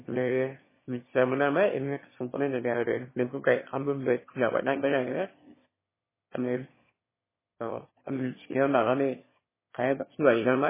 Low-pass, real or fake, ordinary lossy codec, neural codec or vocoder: 3.6 kHz; fake; MP3, 16 kbps; autoencoder, 22.05 kHz, a latent of 192 numbers a frame, VITS, trained on one speaker